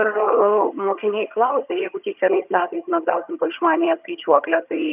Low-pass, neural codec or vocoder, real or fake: 3.6 kHz; vocoder, 22.05 kHz, 80 mel bands, HiFi-GAN; fake